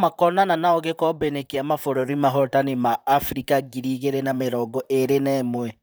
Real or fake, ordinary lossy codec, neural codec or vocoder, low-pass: fake; none; vocoder, 44.1 kHz, 128 mel bands, Pupu-Vocoder; none